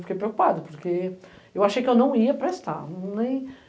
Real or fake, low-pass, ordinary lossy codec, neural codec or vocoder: real; none; none; none